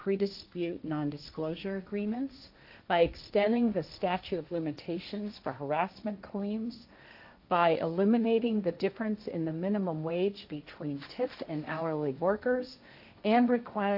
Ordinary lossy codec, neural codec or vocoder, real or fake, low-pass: AAC, 48 kbps; codec, 16 kHz, 1.1 kbps, Voila-Tokenizer; fake; 5.4 kHz